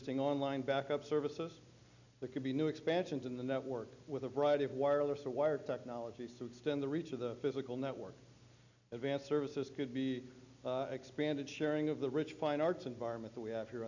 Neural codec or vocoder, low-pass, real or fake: none; 7.2 kHz; real